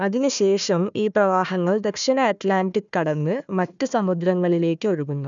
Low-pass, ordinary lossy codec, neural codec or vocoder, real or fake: 7.2 kHz; none; codec, 16 kHz, 1 kbps, FunCodec, trained on Chinese and English, 50 frames a second; fake